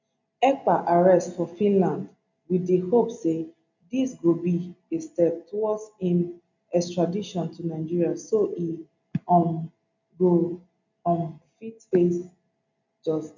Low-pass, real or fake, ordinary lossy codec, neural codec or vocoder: 7.2 kHz; real; none; none